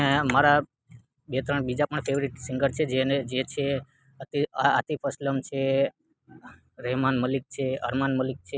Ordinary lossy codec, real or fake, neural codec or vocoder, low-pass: none; real; none; none